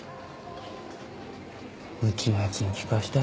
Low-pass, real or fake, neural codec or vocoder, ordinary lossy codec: none; real; none; none